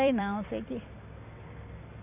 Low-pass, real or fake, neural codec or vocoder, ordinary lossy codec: 3.6 kHz; fake; vocoder, 44.1 kHz, 128 mel bands every 256 samples, BigVGAN v2; none